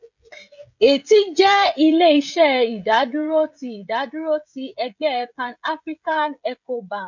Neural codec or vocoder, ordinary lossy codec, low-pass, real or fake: codec, 16 kHz, 16 kbps, FreqCodec, smaller model; none; 7.2 kHz; fake